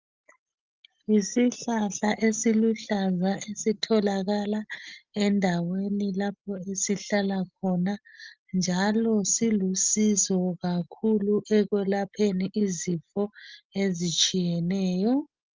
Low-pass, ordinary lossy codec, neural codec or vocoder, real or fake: 7.2 kHz; Opus, 24 kbps; none; real